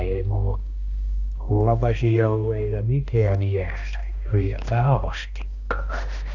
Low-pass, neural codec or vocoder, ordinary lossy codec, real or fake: 7.2 kHz; codec, 16 kHz, 1 kbps, X-Codec, HuBERT features, trained on balanced general audio; none; fake